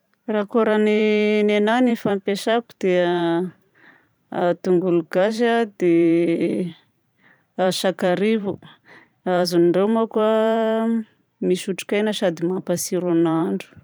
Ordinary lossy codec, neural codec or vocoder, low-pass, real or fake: none; vocoder, 44.1 kHz, 128 mel bands every 512 samples, BigVGAN v2; none; fake